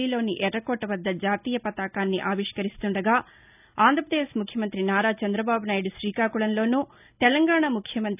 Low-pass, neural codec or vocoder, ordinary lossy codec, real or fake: 3.6 kHz; none; none; real